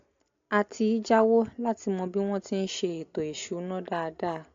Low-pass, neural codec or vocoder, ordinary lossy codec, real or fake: 7.2 kHz; none; AAC, 48 kbps; real